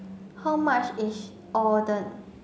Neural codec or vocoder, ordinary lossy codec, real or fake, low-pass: none; none; real; none